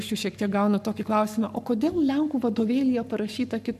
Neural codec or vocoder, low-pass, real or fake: codec, 44.1 kHz, 7.8 kbps, Pupu-Codec; 14.4 kHz; fake